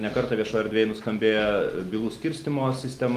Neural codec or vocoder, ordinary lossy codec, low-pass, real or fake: none; Opus, 24 kbps; 14.4 kHz; real